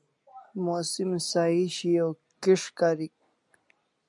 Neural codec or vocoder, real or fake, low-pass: none; real; 10.8 kHz